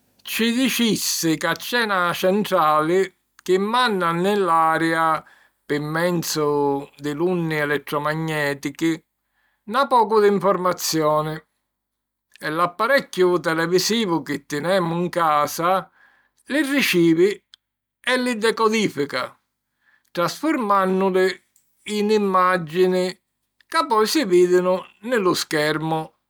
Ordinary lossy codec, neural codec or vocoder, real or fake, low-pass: none; none; real; none